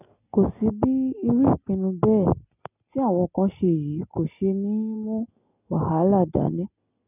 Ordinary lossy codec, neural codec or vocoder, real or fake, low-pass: none; none; real; 3.6 kHz